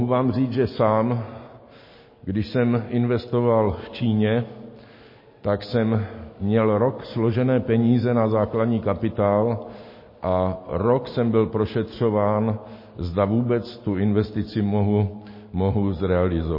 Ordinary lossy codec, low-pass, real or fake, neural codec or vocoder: MP3, 24 kbps; 5.4 kHz; real; none